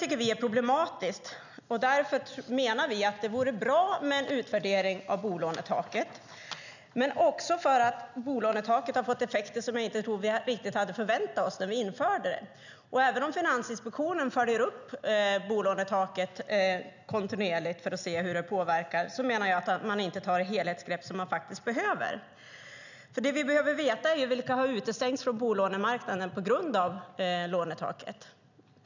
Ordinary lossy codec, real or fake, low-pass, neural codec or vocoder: none; real; 7.2 kHz; none